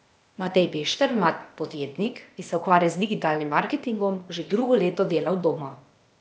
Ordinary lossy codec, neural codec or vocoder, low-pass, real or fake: none; codec, 16 kHz, 0.8 kbps, ZipCodec; none; fake